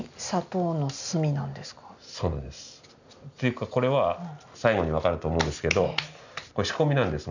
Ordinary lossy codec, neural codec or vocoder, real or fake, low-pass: none; none; real; 7.2 kHz